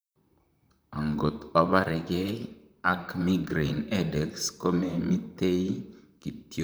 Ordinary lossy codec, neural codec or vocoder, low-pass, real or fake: none; vocoder, 44.1 kHz, 128 mel bands, Pupu-Vocoder; none; fake